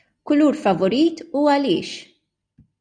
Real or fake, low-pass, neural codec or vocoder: real; 9.9 kHz; none